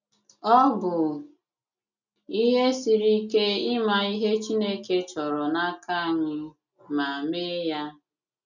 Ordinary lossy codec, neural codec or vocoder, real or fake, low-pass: none; none; real; 7.2 kHz